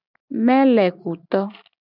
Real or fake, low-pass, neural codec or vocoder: real; 5.4 kHz; none